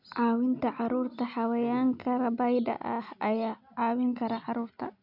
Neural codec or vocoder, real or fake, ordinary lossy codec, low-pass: none; real; none; 5.4 kHz